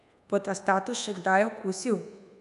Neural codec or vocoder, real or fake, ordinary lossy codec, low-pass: codec, 24 kHz, 1.2 kbps, DualCodec; fake; none; 10.8 kHz